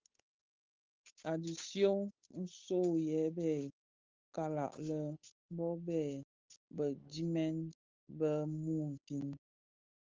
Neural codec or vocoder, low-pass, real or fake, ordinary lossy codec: codec, 16 kHz, 8 kbps, FunCodec, trained on Chinese and English, 25 frames a second; 7.2 kHz; fake; Opus, 32 kbps